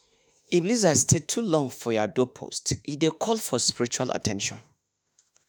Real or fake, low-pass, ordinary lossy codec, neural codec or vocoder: fake; none; none; autoencoder, 48 kHz, 32 numbers a frame, DAC-VAE, trained on Japanese speech